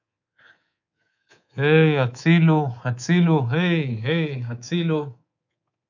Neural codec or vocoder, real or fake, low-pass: codec, 24 kHz, 3.1 kbps, DualCodec; fake; 7.2 kHz